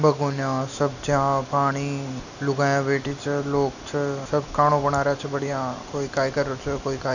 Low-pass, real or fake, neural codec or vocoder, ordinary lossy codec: 7.2 kHz; real; none; none